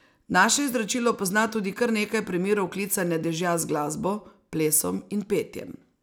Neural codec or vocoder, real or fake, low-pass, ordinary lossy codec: none; real; none; none